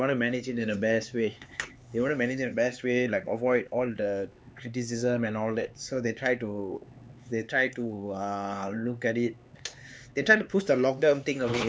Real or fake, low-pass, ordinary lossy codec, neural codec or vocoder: fake; none; none; codec, 16 kHz, 4 kbps, X-Codec, HuBERT features, trained on LibriSpeech